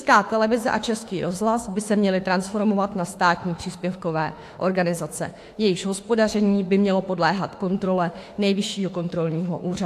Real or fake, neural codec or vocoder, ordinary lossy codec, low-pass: fake; autoencoder, 48 kHz, 32 numbers a frame, DAC-VAE, trained on Japanese speech; AAC, 64 kbps; 14.4 kHz